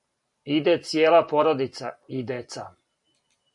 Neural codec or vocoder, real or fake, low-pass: vocoder, 44.1 kHz, 128 mel bands every 512 samples, BigVGAN v2; fake; 10.8 kHz